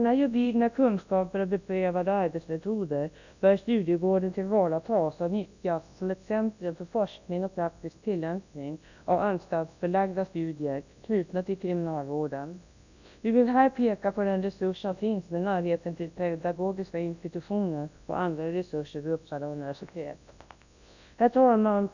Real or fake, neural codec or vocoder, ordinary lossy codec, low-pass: fake; codec, 24 kHz, 0.9 kbps, WavTokenizer, large speech release; none; 7.2 kHz